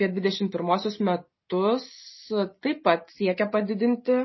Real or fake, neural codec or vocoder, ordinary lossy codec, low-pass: real; none; MP3, 24 kbps; 7.2 kHz